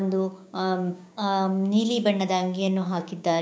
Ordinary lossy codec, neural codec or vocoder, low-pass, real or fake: none; codec, 16 kHz, 6 kbps, DAC; none; fake